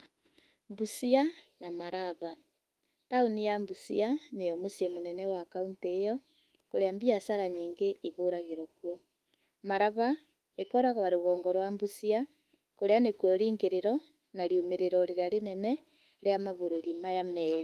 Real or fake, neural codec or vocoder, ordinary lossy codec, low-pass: fake; autoencoder, 48 kHz, 32 numbers a frame, DAC-VAE, trained on Japanese speech; Opus, 32 kbps; 14.4 kHz